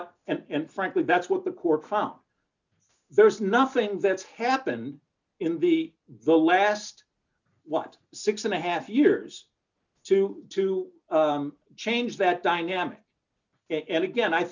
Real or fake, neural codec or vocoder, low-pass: real; none; 7.2 kHz